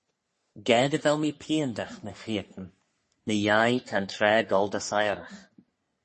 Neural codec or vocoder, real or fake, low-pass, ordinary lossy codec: codec, 44.1 kHz, 3.4 kbps, Pupu-Codec; fake; 10.8 kHz; MP3, 32 kbps